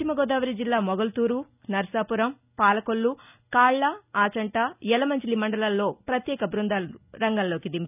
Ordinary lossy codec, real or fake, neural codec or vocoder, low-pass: none; real; none; 3.6 kHz